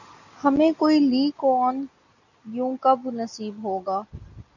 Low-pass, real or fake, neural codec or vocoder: 7.2 kHz; real; none